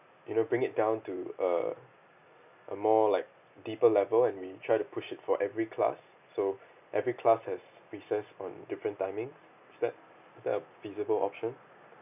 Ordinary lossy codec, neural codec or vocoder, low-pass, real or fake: none; none; 3.6 kHz; real